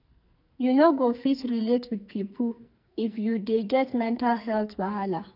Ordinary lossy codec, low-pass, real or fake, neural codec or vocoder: none; 5.4 kHz; fake; codec, 44.1 kHz, 2.6 kbps, SNAC